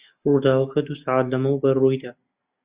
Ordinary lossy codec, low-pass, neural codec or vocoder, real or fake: Opus, 64 kbps; 3.6 kHz; none; real